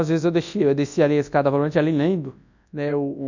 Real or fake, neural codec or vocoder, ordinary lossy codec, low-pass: fake; codec, 24 kHz, 0.9 kbps, WavTokenizer, large speech release; none; 7.2 kHz